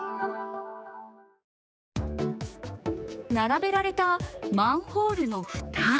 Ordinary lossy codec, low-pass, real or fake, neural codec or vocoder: none; none; fake; codec, 16 kHz, 4 kbps, X-Codec, HuBERT features, trained on general audio